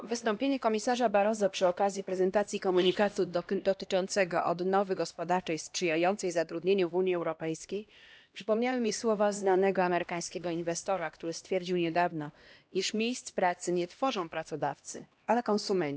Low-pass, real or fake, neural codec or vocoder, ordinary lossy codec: none; fake; codec, 16 kHz, 1 kbps, X-Codec, HuBERT features, trained on LibriSpeech; none